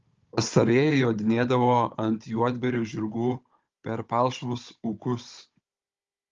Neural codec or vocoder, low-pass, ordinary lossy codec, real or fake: codec, 16 kHz, 16 kbps, FunCodec, trained on Chinese and English, 50 frames a second; 7.2 kHz; Opus, 32 kbps; fake